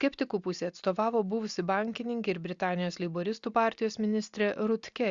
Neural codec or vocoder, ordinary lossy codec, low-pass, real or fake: none; Opus, 64 kbps; 7.2 kHz; real